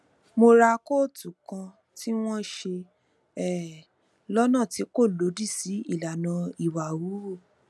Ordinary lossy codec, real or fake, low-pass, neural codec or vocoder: none; real; none; none